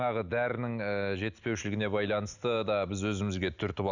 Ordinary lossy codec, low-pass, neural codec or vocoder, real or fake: none; 7.2 kHz; none; real